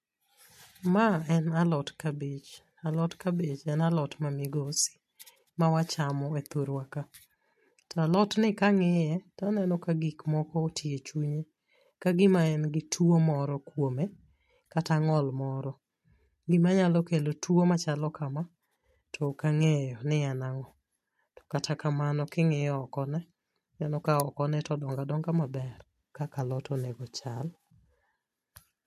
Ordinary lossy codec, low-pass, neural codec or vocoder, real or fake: MP3, 64 kbps; 14.4 kHz; none; real